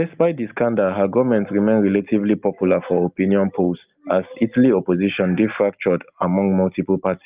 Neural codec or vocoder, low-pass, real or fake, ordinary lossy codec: none; 3.6 kHz; real; Opus, 32 kbps